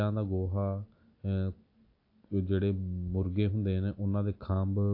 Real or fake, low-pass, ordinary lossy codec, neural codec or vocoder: real; 5.4 kHz; AAC, 48 kbps; none